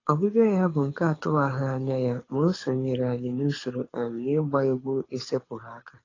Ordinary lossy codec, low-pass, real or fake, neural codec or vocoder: AAC, 32 kbps; 7.2 kHz; fake; codec, 24 kHz, 6 kbps, HILCodec